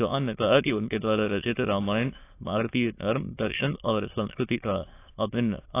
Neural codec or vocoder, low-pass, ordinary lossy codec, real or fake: autoencoder, 22.05 kHz, a latent of 192 numbers a frame, VITS, trained on many speakers; 3.6 kHz; AAC, 24 kbps; fake